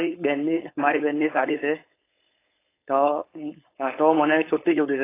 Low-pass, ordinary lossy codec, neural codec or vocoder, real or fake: 3.6 kHz; AAC, 24 kbps; codec, 16 kHz, 4.8 kbps, FACodec; fake